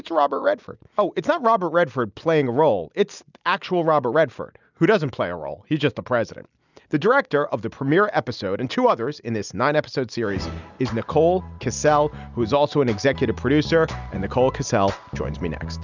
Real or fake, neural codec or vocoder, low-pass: real; none; 7.2 kHz